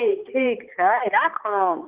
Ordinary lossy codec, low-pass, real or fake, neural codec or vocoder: Opus, 32 kbps; 3.6 kHz; fake; codec, 16 kHz, 4 kbps, X-Codec, HuBERT features, trained on balanced general audio